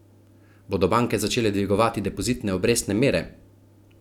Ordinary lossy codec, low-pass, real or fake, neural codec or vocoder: none; 19.8 kHz; real; none